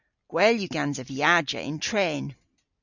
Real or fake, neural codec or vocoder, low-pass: real; none; 7.2 kHz